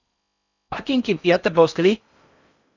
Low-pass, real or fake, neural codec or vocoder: 7.2 kHz; fake; codec, 16 kHz in and 24 kHz out, 0.6 kbps, FocalCodec, streaming, 4096 codes